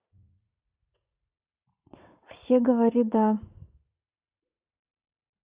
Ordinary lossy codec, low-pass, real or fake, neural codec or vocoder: Opus, 64 kbps; 3.6 kHz; fake; vocoder, 44.1 kHz, 80 mel bands, Vocos